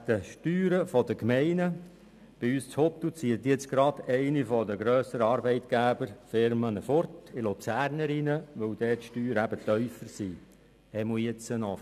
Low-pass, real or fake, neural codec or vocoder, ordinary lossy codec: 14.4 kHz; real; none; none